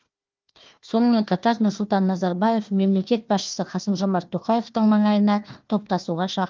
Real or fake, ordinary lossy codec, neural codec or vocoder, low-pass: fake; Opus, 24 kbps; codec, 16 kHz, 1 kbps, FunCodec, trained on Chinese and English, 50 frames a second; 7.2 kHz